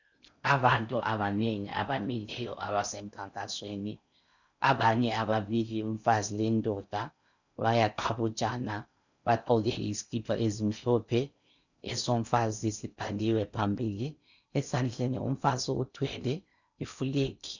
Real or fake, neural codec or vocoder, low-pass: fake; codec, 16 kHz in and 24 kHz out, 0.6 kbps, FocalCodec, streaming, 4096 codes; 7.2 kHz